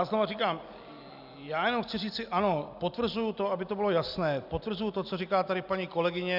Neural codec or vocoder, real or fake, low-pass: none; real; 5.4 kHz